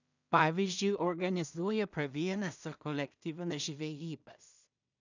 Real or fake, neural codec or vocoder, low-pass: fake; codec, 16 kHz in and 24 kHz out, 0.4 kbps, LongCat-Audio-Codec, two codebook decoder; 7.2 kHz